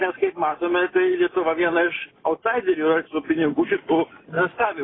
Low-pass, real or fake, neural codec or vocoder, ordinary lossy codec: 7.2 kHz; real; none; AAC, 16 kbps